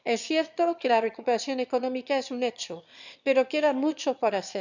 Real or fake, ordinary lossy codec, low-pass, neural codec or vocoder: fake; none; 7.2 kHz; autoencoder, 22.05 kHz, a latent of 192 numbers a frame, VITS, trained on one speaker